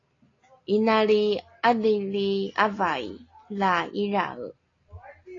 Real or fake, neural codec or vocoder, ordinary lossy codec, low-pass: real; none; AAC, 32 kbps; 7.2 kHz